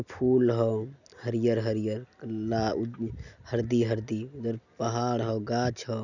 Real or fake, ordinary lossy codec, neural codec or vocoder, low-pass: real; none; none; 7.2 kHz